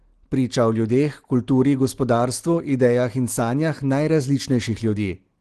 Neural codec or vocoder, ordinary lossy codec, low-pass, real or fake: none; Opus, 16 kbps; 10.8 kHz; real